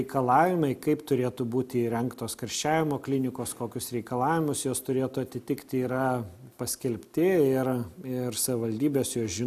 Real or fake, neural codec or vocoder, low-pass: real; none; 14.4 kHz